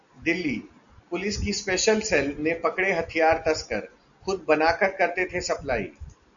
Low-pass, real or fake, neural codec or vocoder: 7.2 kHz; real; none